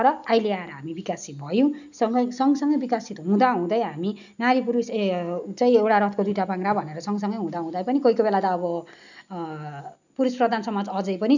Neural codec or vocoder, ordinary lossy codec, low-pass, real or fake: none; none; 7.2 kHz; real